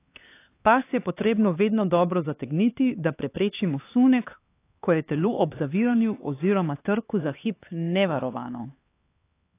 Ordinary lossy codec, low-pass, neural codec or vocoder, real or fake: AAC, 24 kbps; 3.6 kHz; codec, 16 kHz, 4 kbps, X-Codec, HuBERT features, trained on LibriSpeech; fake